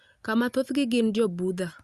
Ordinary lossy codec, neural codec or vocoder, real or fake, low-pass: Opus, 64 kbps; none; real; 14.4 kHz